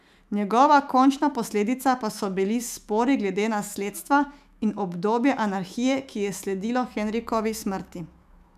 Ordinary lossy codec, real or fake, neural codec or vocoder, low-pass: none; fake; autoencoder, 48 kHz, 128 numbers a frame, DAC-VAE, trained on Japanese speech; 14.4 kHz